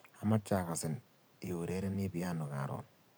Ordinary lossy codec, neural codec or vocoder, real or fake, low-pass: none; vocoder, 44.1 kHz, 128 mel bands every 512 samples, BigVGAN v2; fake; none